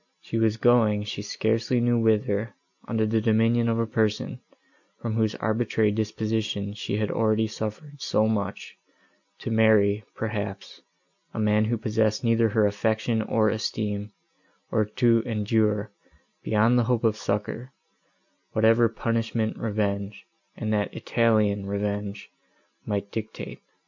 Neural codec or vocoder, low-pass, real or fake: none; 7.2 kHz; real